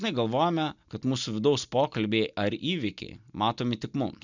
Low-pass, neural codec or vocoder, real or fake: 7.2 kHz; none; real